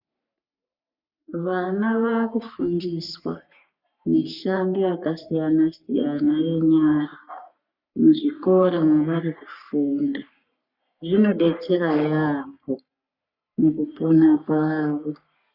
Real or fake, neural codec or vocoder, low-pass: fake; codec, 44.1 kHz, 3.4 kbps, Pupu-Codec; 5.4 kHz